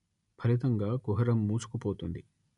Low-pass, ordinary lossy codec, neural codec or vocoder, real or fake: 10.8 kHz; none; none; real